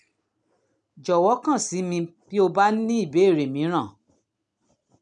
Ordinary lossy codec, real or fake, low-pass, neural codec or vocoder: none; real; 9.9 kHz; none